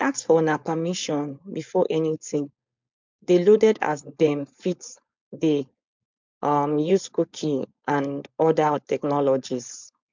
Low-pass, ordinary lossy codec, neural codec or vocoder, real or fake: 7.2 kHz; MP3, 64 kbps; codec, 16 kHz, 4.8 kbps, FACodec; fake